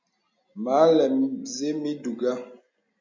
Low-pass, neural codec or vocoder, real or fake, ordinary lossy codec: 7.2 kHz; none; real; MP3, 64 kbps